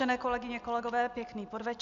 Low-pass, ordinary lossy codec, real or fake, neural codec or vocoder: 7.2 kHz; MP3, 96 kbps; real; none